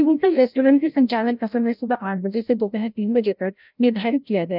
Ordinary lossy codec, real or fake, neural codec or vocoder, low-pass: none; fake; codec, 16 kHz, 0.5 kbps, FreqCodec, larger model; 5.4 kHz